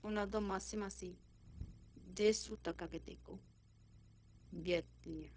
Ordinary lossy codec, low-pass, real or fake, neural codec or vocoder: none; none; fake; codec, 16 kHz, 0.4 kbps, LongCat-Audio-Codec